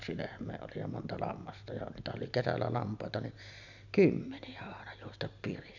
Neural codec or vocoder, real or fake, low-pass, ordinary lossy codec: none; real; 7.2 kHz; none